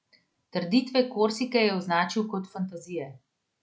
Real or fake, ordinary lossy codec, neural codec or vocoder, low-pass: real; none; none; none